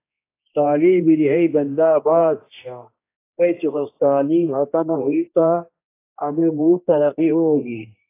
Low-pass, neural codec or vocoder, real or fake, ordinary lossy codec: 3.6 kHz; codec, 16 kHz, 1 kbps, X-Codec, HuBERT features, trained on general audio; fake; AAC, 24 kbps